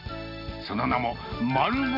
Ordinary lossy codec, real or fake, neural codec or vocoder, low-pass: none; real; none; 5.4 kHz